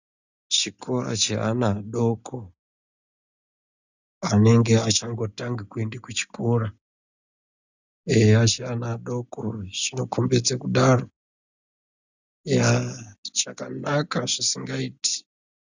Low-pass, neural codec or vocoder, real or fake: 7.2 kHz; none; real